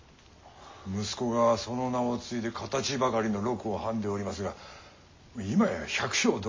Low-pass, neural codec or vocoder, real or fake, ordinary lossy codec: 7.2 kHz; none; real; MP3, 32 kbps